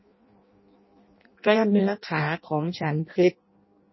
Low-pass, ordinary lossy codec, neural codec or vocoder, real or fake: 7.2 kHz; MP3, 24 kbps; codec, 16 kHz in and 24 kHz out, 0.6 kbps, FireRedTTS-2 codec; fake